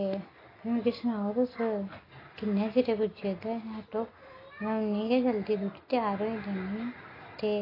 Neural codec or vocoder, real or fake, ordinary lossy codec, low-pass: none; real; AAC, 24 kbps; 5.4 kHz